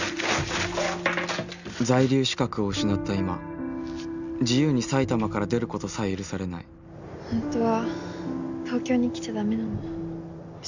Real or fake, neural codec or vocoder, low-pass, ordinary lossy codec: real; none; 7.2 kHz; none